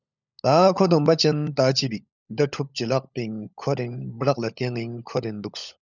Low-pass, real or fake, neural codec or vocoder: 7.2 kHz; fake; codec, 16 kHz, 16 kbps, FunCodec, trained on LibriTTS, 50 frames a second